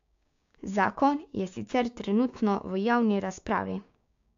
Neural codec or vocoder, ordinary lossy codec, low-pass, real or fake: codec, 16 kHz, 6 kbps, DAC; AAC, 64 kbps; 7.2 kHz; fake